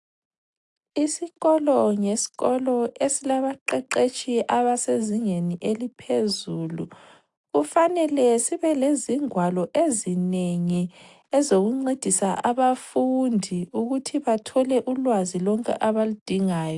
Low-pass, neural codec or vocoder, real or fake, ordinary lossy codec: 10.8 kHz; none; real; AAC, 64 kbps